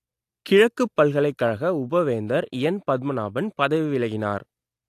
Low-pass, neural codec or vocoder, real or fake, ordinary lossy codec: 14.4 kHz; none; real; AAC, 64 kbps